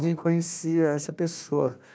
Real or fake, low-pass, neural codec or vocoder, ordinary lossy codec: fake; none; codec, 16 kHz, 1 kbps, FunCodec, trained on Chinese and English, 50 frames a second; none